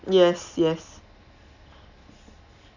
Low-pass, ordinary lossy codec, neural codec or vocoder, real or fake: 7.2 kHz; none; none; real